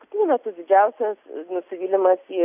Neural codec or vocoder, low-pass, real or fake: none; 3.6 kHz; real